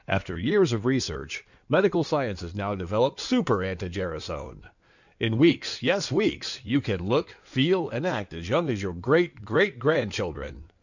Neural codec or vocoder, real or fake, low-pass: codec, 16 kHz in and 24 kHz out, 2.2 kbps, FireRedTTS-2 codec; fake; 7.2 kHz